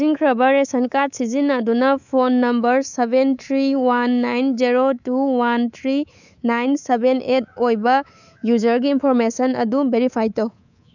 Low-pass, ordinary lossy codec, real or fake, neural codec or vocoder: 7.2 kHz; none; fake; codec, 24 kHz, 3.1 kbps, DualCodec